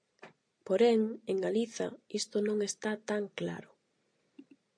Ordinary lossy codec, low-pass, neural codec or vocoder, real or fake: MP3, 48 kbps; 9.9 kHz; none; real